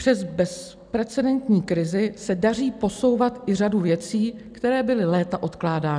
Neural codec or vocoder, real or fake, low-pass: none; real; 9.9 kHz